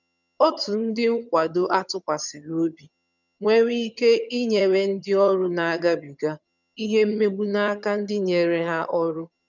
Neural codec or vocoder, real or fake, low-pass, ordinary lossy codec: vocoder, 22.05 kHz, 80 mel bands, HiFi-GAN; fake; 7.2 kHz; none